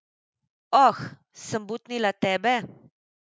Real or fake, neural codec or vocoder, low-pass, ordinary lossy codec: real; none; none; none